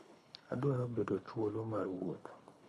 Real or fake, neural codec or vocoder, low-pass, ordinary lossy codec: fake; codec, 24 kHz, 3 kbps, HILCodec; none; none